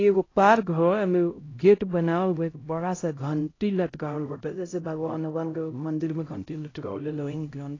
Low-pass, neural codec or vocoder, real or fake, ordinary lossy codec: 7.2 kHz; codec, 16 kHz, 0.5 kbps, X-Codec, HuBERT features, trained on LibriSpeech; fake; AAC, 32 kbps